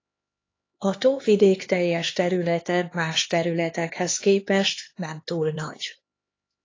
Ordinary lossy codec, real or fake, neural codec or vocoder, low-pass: AAC, 32 kbps; fake; codec, 16 kHz, 2 kbps, X-Codec, HuBERT features, trained on LibriSpeech; 7.2 kHz